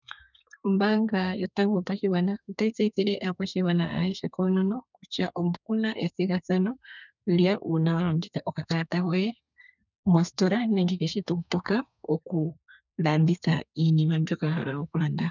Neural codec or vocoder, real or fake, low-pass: codec, 32 kHz, 1.9 kbps, SNAC; fake; 7.2 kHz